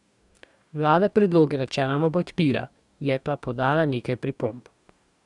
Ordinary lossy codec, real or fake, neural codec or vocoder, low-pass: none; fake; codec, 44.1 kHz, 2.6 kbps, DAC; 10.8 kHz